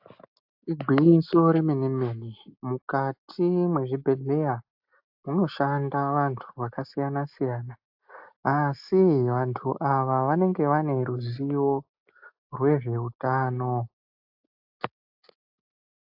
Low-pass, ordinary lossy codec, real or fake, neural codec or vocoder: 5.4 kHz; AAC, 48 kbps; real; none